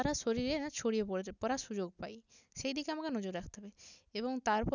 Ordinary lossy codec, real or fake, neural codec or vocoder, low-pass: none; real; none; 7.2 kHz